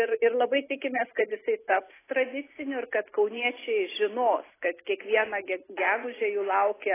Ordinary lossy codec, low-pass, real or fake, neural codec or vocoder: AAC, 16 kbps; 3.6 kHz; real; none